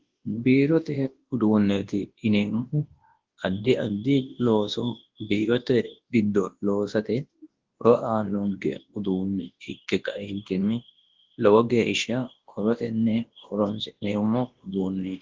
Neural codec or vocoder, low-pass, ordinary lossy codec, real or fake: codec, 24 kHz, 0.9 kbps, WavTokenizer, large speech release; 7.2 kHz; Opus, 16 kbps; fake